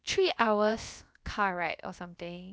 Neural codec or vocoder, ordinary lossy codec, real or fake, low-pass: codec, 16 kHz, about 1 kbps, DyCAST, with the encoder's durations; none; fake; none